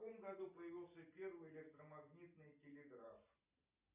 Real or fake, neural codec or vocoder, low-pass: real; none; 3.6 kHz